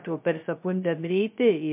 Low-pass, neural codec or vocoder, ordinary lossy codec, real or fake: 3.6 kHz; codec, 16 kHz, 0.2 kbps, FocalCodec; MP3, 32 kbps; fake